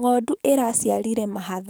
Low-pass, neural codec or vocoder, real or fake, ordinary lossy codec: none; codec, 44.1 kHz, 7.8 kbps, DAC; fake; none